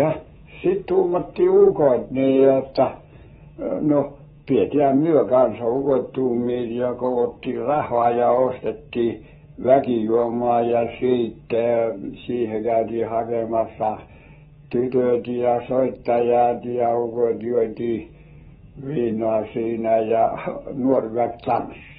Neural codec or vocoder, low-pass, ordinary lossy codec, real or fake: codec, 24 kHz, 3.1 kbps, DualCodec; 10.8 kHz; AAC, 16 kbps; fake